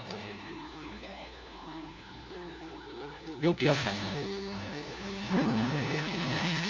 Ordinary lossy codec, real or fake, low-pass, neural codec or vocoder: MP3, 48 kbps; fake; 7.2 kHz; codec, 16 kHz, 1 kbps, FunCodec, trained on LibriTTS, 50 frames a second